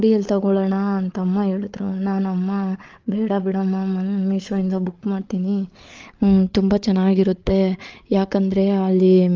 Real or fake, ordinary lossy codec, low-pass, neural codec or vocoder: fake; Opus, 24 kbps; 7.2 kHz; codec, 16 kHz, 8 kbps, FreqCodec, larger model